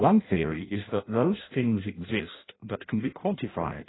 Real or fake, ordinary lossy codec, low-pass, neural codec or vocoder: fake; AAC, 16 kbps; 7.2 kHz; codec, 16 kHz in and 24 kHz out, 0.6 kbps, FireRedTTS-2 codec